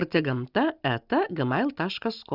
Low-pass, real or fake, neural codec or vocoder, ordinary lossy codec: 5.4 kHz; real; none; Opus, 64 kbps